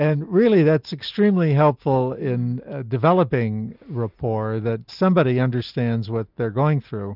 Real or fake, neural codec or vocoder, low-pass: real; none; 5.4 kHz